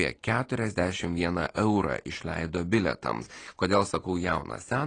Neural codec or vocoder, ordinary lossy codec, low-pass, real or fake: none; AAC, 32 kbps; 9.9 kHz; real